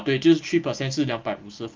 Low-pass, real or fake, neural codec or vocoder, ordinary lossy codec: 7.2 kHz; real; none; Opus, 32 kbps